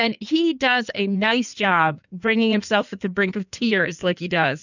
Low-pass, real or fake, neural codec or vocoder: 7.2 kHz; fake; codec, 16 kHz in and 24 kHz out, 1.1 kbps, FireRedTTS-2 codec